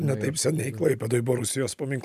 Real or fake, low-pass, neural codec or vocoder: real; 14.4 kHz; none